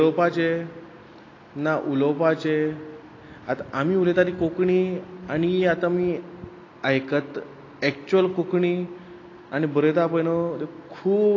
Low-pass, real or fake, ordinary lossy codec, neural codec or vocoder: 7.2 kHz; real; AAC, 32 kbps; none